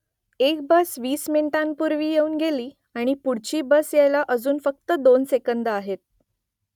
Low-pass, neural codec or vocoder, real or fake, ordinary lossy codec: 19.8 kHz; none; real; none